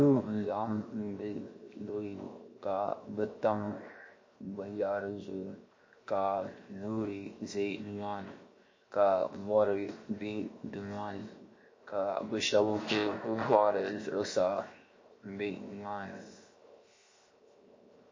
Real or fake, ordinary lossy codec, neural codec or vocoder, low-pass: fake; MP3, 32 kbps; codec, 16 kHz, 0.7 kbps, FocalCodec; 7.2 kHz